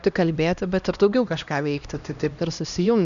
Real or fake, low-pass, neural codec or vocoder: fake; 7.2 kHz; codec, 16 kHz, 1 kbps, X-Codec, HuBERT features, trained on LibriSpeech